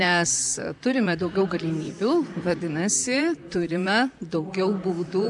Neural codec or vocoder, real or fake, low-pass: vocoder, 44.1 kHz, 128 mel bands, Pupu-Vocoder; fake; 10.8 kHz